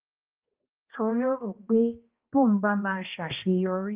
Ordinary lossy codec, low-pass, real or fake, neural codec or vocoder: Opus, 24 kbps; 3.6 kHz; fake; codec, 16 kHz, 1 kbps, X-Codec, HuBERT features, trained on balanced general audio